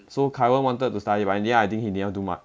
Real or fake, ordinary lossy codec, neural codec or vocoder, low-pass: real; none; none; none